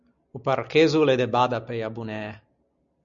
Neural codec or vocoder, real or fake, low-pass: none; real; 7.2 kHz